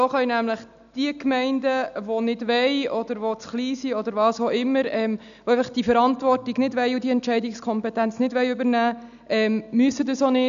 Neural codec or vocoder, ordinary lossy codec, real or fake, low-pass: none; none; real; 7.2 kHz